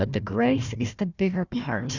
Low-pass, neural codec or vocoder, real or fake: 7.2 kHz; codec, 16 kHz, 1 kbps, FunCodec, trained on Chinese and English, 50 frames a second; fake